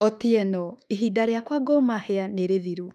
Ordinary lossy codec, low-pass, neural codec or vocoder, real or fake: none; 14.4 kHz; autoencoder, 48 kHz, 32 numbers a frame, DAC-VAE, trained on Japanese speech; fake